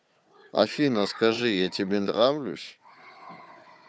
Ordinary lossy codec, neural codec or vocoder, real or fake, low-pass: none; codec, 16 kHz, 4 kbps, FunCodec, trained on Chinese and English, 50 frames a second; fake; none